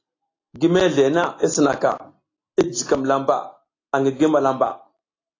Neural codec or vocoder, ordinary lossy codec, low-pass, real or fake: none; AAC, 32 kbps; 7.2 kHz; real